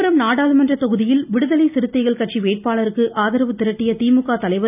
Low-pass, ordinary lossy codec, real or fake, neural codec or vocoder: 3.6 kHz; none; real; none